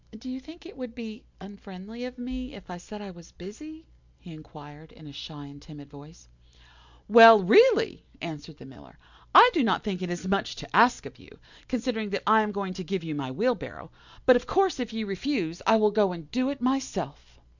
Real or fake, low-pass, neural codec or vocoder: real; 7.2 kHz; none